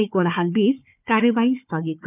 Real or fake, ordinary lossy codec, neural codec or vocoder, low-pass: fake; none; codec, 16 kHz, 4 kbps, FunCodec, trained on Chinese and English, 50 frames a second; 3.6 kHz